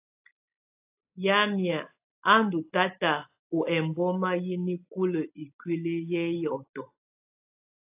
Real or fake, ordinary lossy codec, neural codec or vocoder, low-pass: real; AAC, 32 kbps; none; 3.6 kHz